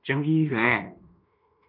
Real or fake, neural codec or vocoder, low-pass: fake; codec, 16 kHz in and 24 kHz out, 0.9 kbps, LongCat-Audio-Codec, fine tuned four codebook decoder; 5.4 kHz